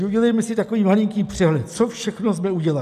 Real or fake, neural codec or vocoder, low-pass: real; none; 14.4 kHz